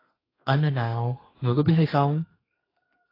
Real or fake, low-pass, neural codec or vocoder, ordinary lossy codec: fake; 5.4 kHz; codec, 32 kHz, 1.9 kbps, SNAC; AAC, 24 kbps